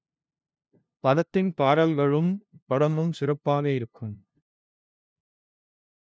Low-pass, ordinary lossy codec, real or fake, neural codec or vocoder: none; none; fake; codec, 16 kHz, 0.5 kbps, FunCodec, trained on LibriTTS, 25 frames a second